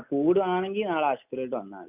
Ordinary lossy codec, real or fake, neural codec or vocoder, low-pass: none; real; none; 3.6 kHz